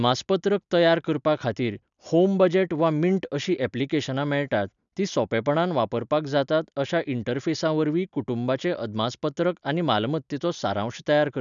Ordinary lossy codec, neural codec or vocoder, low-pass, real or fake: none; none; 7.2 kHz; real